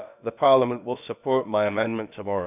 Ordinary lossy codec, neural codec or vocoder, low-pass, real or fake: none; codec, 16 kHz, about 1 kbps, DyCAST, with the encoder's durations; 3.6 kHz; fake